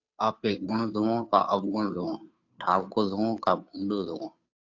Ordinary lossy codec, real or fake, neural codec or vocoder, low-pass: AAC, 48 kbps; fake; codec, 16 kHz, 2 kbps, FunCodec, trained on Chinese and English, 25 frames a second; 7.2 kHz